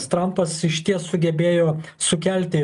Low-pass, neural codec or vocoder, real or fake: 10.8 kHz; none; real